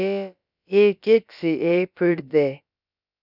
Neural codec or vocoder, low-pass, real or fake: codec, 16 kHz, about 1 kbps, DyCAST, with the encoder's durations; 5.4 kHz; fake